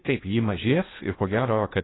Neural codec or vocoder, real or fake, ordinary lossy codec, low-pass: codec, 16 kHz in and 24 kHz out, 0.8 kbps, FocalCodec, streaming, 65536 codes; fake; AAC, 16 kbps; 7.2 kHz